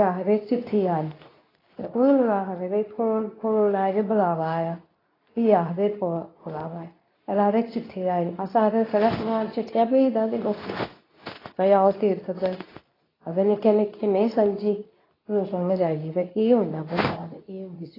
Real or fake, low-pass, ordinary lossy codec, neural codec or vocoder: fake; 5.4 kHz; AAC, 24 kbps; codec, 24 kHz, 0.9 kbps, WavTokenizer, medium speech release version 2